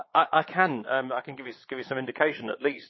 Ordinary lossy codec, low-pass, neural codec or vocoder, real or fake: MP3, 24 kbps; 7.2 kHz; vocoder, 22.05 kHz, 80 mel bands, Vocos; fake